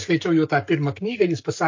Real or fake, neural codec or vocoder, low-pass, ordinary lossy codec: fake; codec, 44.1 kHz, 7.8 kbps, Pupu-Codec; 7.2 kHz; MP3, 48 kbps